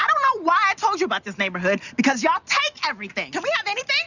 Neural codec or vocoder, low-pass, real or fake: none; 7.2 kHz; real